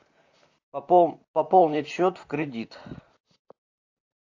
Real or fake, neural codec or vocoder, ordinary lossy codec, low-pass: fake; vocoder, 44.1 kHz, 128 mel bands, Pupu-Vocoder; AAC, 48 kbps; 7.2 kHz